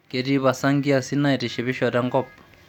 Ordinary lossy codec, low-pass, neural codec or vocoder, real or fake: none; 19.8 kHz; none; real